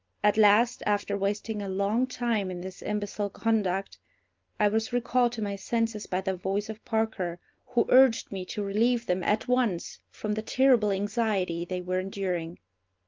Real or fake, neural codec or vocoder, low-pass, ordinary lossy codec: real; none; 7.2 kHz; Opus, 32 kbps